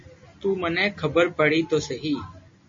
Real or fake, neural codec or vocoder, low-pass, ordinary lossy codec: real; none; 7.2 kHz; MP3, 32 kbps